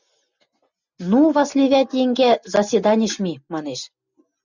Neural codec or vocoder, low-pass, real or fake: none; 7.2 kHz; real